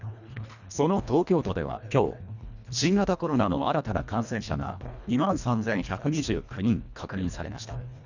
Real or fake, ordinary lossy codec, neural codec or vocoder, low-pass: fake; none; codec, 24 kHz, 1.5 kbps, HILCodec; 7.2 kHz